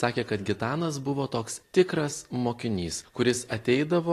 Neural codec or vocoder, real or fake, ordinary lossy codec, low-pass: none; real; AAC, 48 kbps; 14.4 kHz